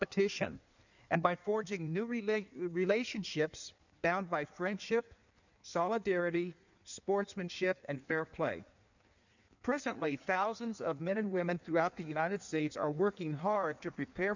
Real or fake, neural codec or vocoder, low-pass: fake; codec, 16 kHz in and 24 kHz out, 1.1 kbps, FireRedTTS-2 codec; 7.2 kHz